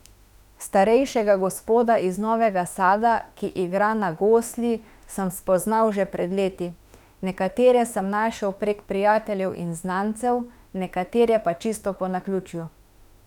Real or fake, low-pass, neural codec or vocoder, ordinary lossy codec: fake; 19.8 kHz; autoencoder, 48 kHz, 32 numbers a frame, DAC-VAE, trained on Japanese speech; none